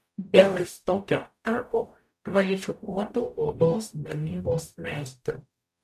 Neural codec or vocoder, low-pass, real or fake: codec, 44.1 kHz, 0.9 kbps, DAC; 14.4 kHz; fake